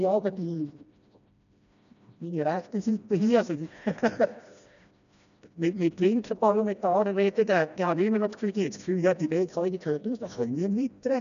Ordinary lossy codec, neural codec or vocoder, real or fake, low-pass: none; codec, 16 kHz, 1 kbps, FreqCodec, smaller model; fake; 7.2 kHz